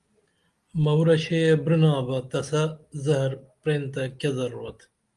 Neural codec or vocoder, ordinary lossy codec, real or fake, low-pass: none; Opus, 32 kbps; real; 10.8 kHz